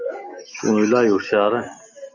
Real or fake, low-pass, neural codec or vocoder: real; 7.2 kHz; none